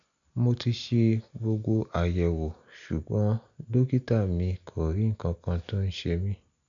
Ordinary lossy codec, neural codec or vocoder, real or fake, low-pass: none; none; real; 7.2 kHz